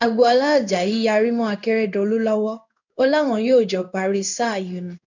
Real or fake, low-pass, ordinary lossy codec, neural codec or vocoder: fake; 7.2 kHz; none; codec, 16 kHz in and 24 kHz out, 1 kbps, XY-Tokenizer